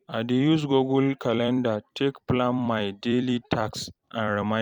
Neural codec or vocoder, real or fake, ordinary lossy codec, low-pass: vocoder, 44.1 kHz, 128 mel bands every 256 samples, BigVGAN v2; fake; none; 19.8 kHz